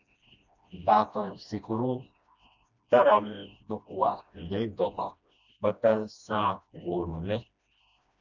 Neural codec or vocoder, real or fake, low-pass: codec, 16 kHz, 1 kbps, FreqCodec, smaller model; fake; 7.2 kHz